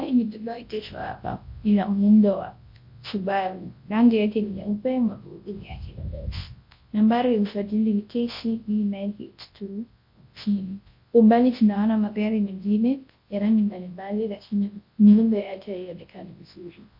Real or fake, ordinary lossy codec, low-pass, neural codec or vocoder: fake; MP3, 32 kbps; 5.4 kHz; codec, 24 kHz, 0.9 kbps, WavTokenizer, large speech release